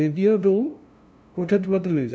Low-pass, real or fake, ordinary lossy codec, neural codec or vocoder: none; fake; none; codec, 16 kHz, 0.5 kbps, FunCodec, trained on LibriTTS, 25 frames a second